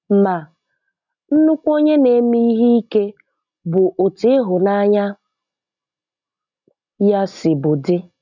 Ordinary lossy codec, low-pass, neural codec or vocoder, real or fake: none; 7.2 kHz; none; real